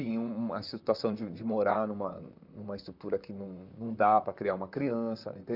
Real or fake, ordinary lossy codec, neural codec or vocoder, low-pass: fake; none; vocoder, 44.1 kHz, 128 mel bands, Pupu-Vocoder; 5.4 kHz